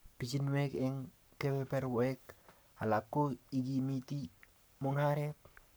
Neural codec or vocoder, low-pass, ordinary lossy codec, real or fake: codec, 44.1 kHz, 7.8 kbps, Pupu-Codec; none; none; fake